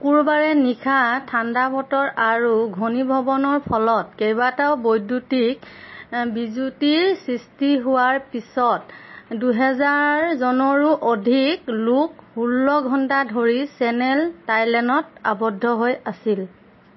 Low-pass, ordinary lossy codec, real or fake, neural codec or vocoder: 7.2 kHz; MP3, 24 kbps; real; none